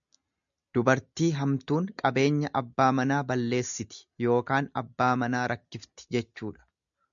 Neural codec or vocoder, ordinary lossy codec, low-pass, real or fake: none; MP3, 96 kbps; 7.2 kHz; real